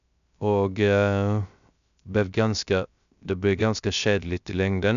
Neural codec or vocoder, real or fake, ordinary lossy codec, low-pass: codec, 16 kHz, 0.3 kbps, FocalCodec; fake; none; 7.2 kHz